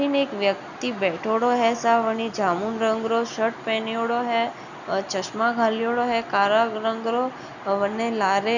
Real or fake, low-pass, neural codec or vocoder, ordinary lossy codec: real; 7.2 kHz; none; none